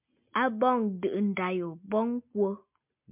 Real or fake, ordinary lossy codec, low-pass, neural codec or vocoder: real; MP3, 32 kbps; 3.6 kHz; none